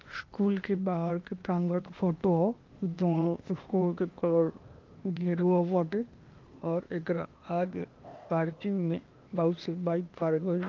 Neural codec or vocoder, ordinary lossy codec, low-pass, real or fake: codec, 16 kHz, 0.8 kbps, ZipCodec; Opus, 24 kbps; 7.2 kHz; fake